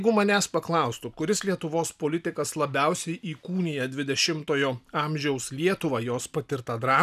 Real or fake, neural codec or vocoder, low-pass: real; none; 14.4 kHz